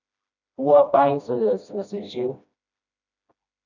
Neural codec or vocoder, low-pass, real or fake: codec, 16 kHz, 1 kbps, FreqCodec, smaller model; 7.2 kHz; fake